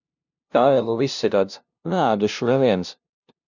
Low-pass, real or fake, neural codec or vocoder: 7.2 kHz; fake; codec, 16 kHz, 0.5 kbps, FunCodec, trained on LibriTTS, 25 frames a second